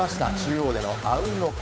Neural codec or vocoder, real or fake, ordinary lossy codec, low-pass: codec, 16 kHz, 8 kbps, FunCodec, trained on Chinese and English, 25 frames a second; fake; none; none